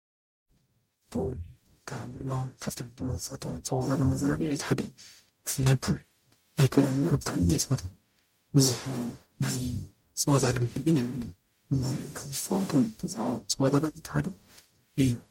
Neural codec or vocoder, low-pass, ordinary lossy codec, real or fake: codec, 44.1 kHz, 0.9 kbps, DAC; 19.8 kHz; MP3, 64 kbps; fake